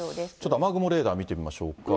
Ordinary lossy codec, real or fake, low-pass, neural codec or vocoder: none; real; none; none